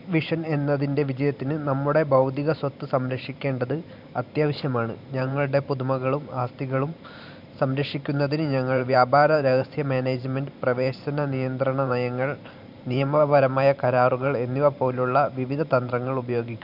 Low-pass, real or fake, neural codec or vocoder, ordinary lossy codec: 5.4 kHz; fake; vocoder, 44.1 kHz, 128 mel bands every 512 samples, BigVGAN v2; none